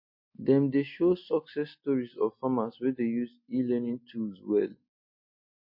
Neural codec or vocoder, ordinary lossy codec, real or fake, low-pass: none; MP3, 32 kbps; real; 5.4 kHz